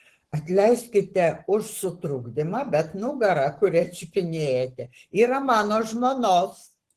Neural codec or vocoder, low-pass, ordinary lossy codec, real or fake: codec, 44.1 kHz, 7.8 kbps, Pupu-Codec; 14.4 kHz; Opus, 16 kbps; fake